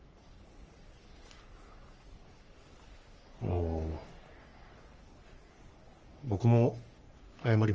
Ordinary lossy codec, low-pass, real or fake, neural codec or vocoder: Opus, 24 kbps; 7.2 kHz; fake; codec, 44.1 kHz, 3.4 kbps, Pupu-Codec